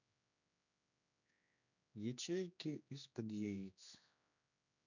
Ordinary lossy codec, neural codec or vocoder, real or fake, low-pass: none; codec, 16 kHz, 2 kbps, X-Codec, HuBERT features, trained on general audio; fake; 7.2 kHz